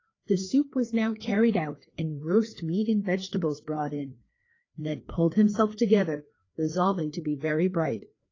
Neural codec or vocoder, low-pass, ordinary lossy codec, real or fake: codec, 16 kHz, 2 kbps, FreqCodec, larger model; 7.2 kHz; AAC, 32 kbps; fake